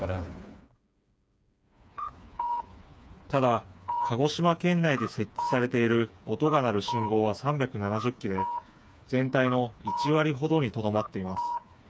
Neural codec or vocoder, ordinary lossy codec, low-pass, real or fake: codec, 16 kHz, 4 kbps, FreqCodec, smaller model; none; none; fake